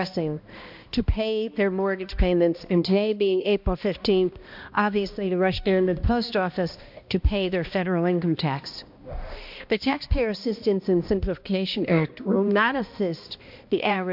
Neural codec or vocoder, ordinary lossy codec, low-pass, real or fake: codec, 16 kHz, 1 kbps, X-Codec, HuBERT features, trained on balanced general audio; MP3, 48 kbps; 5.4 kHz; fake